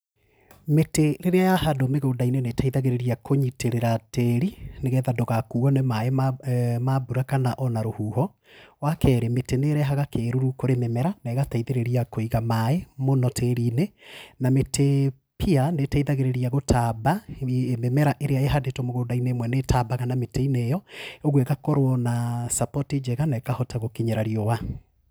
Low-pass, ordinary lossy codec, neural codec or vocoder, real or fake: none; none; none; real